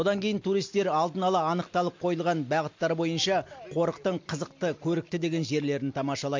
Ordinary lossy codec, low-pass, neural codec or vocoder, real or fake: MP3, 48 kbps; 7.2 kHz; none; real